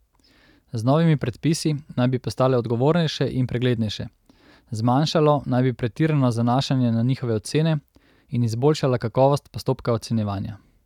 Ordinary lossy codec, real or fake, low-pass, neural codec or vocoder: none; real; 19.8 kHz; none